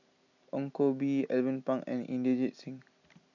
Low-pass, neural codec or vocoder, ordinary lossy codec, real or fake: 7.2 kHz; none; none; real